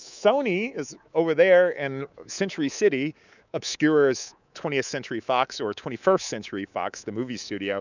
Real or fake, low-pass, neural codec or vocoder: fake; 7.2 kHz; codec, 24 kHz, 3.1 kbps, DualCodec